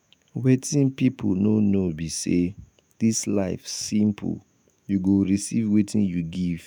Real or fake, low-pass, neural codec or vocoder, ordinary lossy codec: fake; none; autoencoder, 48 kHz, 128 numbers a frame, DAC-VAE, trained on Japanese speech; none